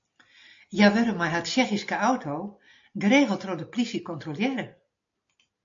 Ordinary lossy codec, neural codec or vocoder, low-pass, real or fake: AAC, 48 kbps; none; 7.2 kHz; real